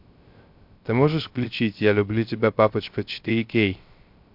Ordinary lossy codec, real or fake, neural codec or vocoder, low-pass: MP3, 48 kbps; fake; codec, 16 kHz, 0.3 kbps, FocalCodec; 5.4 kHz